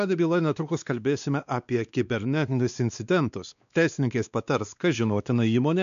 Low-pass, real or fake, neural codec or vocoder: 7.2 kHz; fake; codec, 16 kHz, 2 kbps, X-Codec, WavLM features, trained on Multilingual LibriSpeech